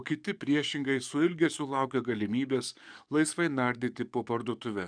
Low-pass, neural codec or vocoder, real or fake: 9.9 kHz; codec, 44.1 kHz, 7.8 kbps, DAC; fake